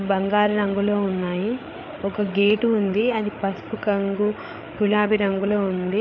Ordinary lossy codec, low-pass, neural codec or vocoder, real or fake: none; 7.2 kHz; codec, 16 kHz, 8 kbps, FreqCodec, larger model; fake